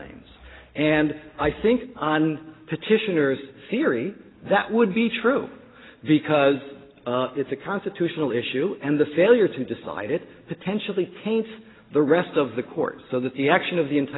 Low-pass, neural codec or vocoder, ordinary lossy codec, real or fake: 7.2 kHz; none; AAC, 16 kbps; real